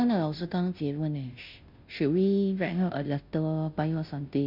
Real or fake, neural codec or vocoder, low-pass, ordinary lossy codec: fake; codec, 16 kHz, 0.5 kbps, FunCodec, trained on Chinese and English, 25 frames a second; 5.4 kHz; none